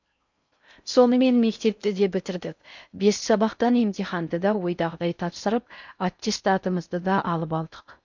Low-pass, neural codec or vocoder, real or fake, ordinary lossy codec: 7.2 kHz; codec, 16 kHz in and 24 kHz out, 0.6 kbps, FocalCodec, streaming, 4096 codes; fake; none